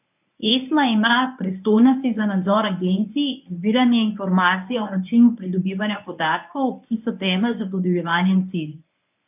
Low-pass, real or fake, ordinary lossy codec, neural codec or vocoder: 3.6 kHz; fake; none; codec, 24 kHz, 0.9 kbps, WavTokenizer, medium speech release version 1